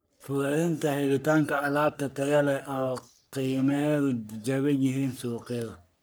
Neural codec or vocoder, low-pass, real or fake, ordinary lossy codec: codec, 44.1 kHz, 3.4 kbps, Pupu-Codec; none; fake; none